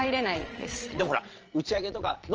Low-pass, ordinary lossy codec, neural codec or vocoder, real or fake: 7.2 kHz; Opus, 24 kbps; vocoder, 22.05 kHz, 80 mel bands, Vocos; fake